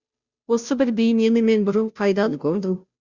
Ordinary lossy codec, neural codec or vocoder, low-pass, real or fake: Opus, 64 kbps; codec, 16 kHz, 0.5 kbps, FunCodec, trained on Chinese and English, 25 frames a second; 7.2 kHz; fake